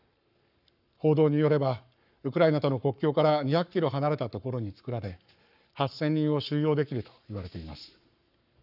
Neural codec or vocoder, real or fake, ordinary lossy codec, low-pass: codec, 44.1 kHz, 7.8 kbps, Pupu-Codec; fake; none; 5.4 kHz